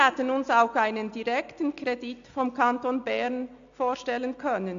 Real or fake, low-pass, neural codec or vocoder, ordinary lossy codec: real; 7.2 kHz; none; AAC, 64 kbps